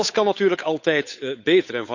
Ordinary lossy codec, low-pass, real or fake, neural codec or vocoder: none; 7.2 kHz; fake; codec, 16 kHz, 8 kbps, FunCodec, trained on Chinese and English, 25 frames a second